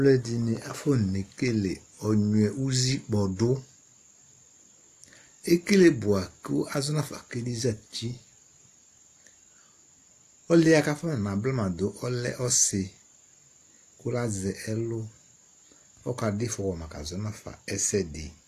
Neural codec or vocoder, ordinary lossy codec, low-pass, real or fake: none; AAC, 64 kbps; 14.4 kHz; real